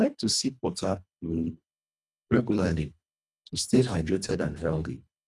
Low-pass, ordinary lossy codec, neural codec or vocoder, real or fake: none; none; codec, 24 kHz, 1.5 kbps, HILCodec; fake